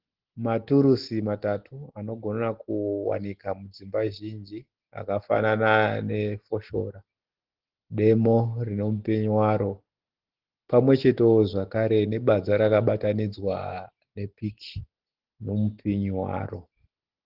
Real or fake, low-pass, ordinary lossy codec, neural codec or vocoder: real; 5.4 kHz; Opus, 16 kbps; none